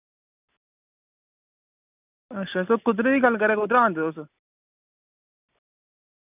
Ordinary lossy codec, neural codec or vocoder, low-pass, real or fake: none; none; 3.6 kHz; real